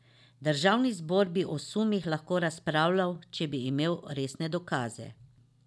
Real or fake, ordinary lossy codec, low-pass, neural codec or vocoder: real; none; none; none